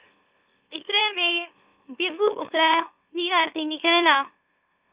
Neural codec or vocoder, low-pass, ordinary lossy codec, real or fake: autoencoder, 44.1 kHz, a latent of 192 numbers a frame, MeloTTS; 3.6 kHz; Opus, 64 kbps; fake